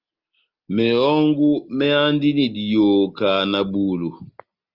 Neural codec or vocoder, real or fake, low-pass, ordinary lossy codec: none; real; 5.4 kHz; Opus, 32 kbps